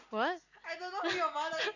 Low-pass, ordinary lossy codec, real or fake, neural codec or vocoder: 7.2 kHz; none; real; none